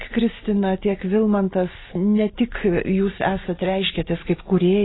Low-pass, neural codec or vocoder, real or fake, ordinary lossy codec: 7.2 kHz; none; real; AAC, 16 kbps